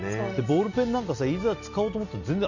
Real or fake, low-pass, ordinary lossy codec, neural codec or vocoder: real; 7.2 kHz; none; none